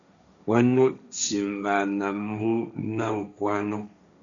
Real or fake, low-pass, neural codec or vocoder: fake; 7.2 kHz; codec, 16 kHz, 1.1 kbps, Voila-Tokenizer